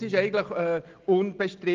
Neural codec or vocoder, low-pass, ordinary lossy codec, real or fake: none; 7.2 kHz; Opus, 32 kbps; real